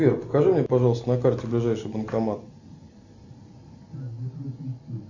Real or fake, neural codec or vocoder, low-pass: real; none; 7.2 kHz